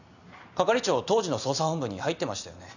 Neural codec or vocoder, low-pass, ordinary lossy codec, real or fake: none; 7.2 kHz; none; real